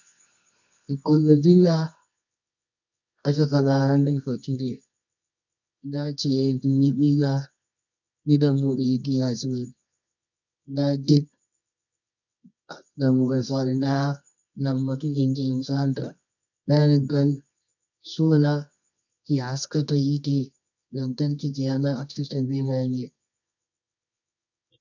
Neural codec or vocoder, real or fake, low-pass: codec, 24 kHz, 0.9 kbps, WavTokenizer, medium music audio release; fake; 7.2 kHz